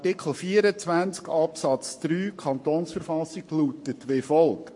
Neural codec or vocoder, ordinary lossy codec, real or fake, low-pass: codec, 44.1 kHz, 7.8 kbps, Pupu-Codec; MP3, 48 kbps; fake; 10.8 kHz